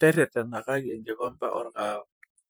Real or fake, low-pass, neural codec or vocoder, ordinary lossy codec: fake; none; vocoder, 44.1 kHz, 128 mel bands, Pupu-Vocoder; none